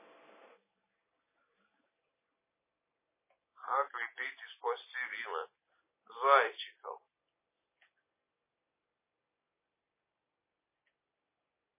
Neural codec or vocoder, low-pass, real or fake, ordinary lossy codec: none; 3.6 kHz; real; MP3, 16 kbps